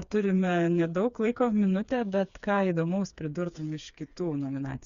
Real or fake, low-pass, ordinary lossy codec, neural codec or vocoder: fake; 7.2 kHz; Opus, 64 kbps; codec, 16 kHz, 4 kbps, FreqCodec, smaller model